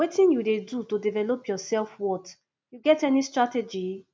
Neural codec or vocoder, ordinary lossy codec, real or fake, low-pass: none; none; real; none